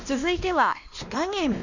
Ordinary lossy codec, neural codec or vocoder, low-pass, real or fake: none; codec, 16 kHz, 1 kbps, X-Codec, WavLM features, trained on Multilingual LibriSpeech; 7.2 kHz; fake